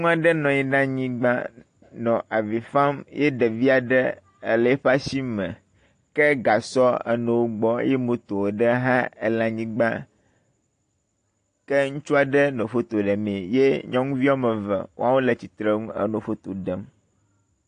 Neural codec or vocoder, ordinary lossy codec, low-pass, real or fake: none; AAC, 48 kbps; 9.9 kHz; real